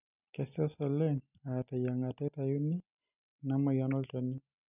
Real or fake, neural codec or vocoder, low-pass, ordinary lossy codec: real; none; 3.6 kHz; none